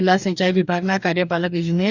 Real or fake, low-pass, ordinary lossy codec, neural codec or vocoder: fake; 7.2 kHz; none; codec, 44.1 kHz, 2.6 kbps, DAC